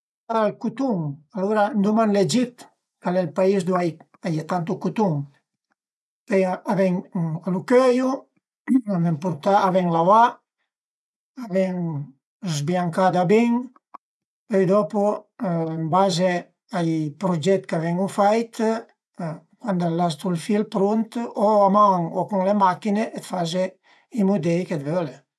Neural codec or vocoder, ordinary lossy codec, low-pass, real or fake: vocoder, 24 kHz, 100 mel bands, Vocos; none; none; fake